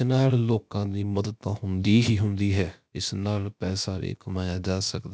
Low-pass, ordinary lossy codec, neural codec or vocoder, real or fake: none; none; codec, 16 kHz, about 1 kbps, DyCAST, with the encoder's durations; fake